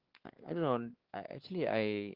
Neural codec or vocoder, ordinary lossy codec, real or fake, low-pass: codec, 16 kHz, 4 kbps, X-Codec, WavLM features, trained on Multilingual LibriSpeech; Opus, 16 kbps; fake; 5.4 kHz